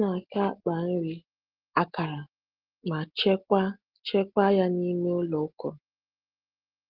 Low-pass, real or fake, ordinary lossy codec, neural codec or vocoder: 5.4 kHz; real; Opus, 16 kbps; none